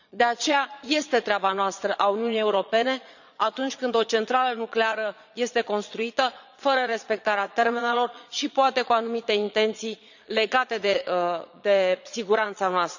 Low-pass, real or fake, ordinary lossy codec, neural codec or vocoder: 7.2 kHz; fake; none; vocoder, 22.05 kHz, 80 mel bands, Vocos